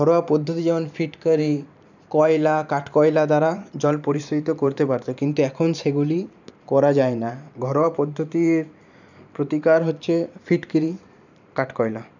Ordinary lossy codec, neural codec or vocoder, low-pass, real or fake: none; vocoder, 44.1 kHz, 128 mel bands every 512 samples, BigVGAN v2; 7.2 kHz; fake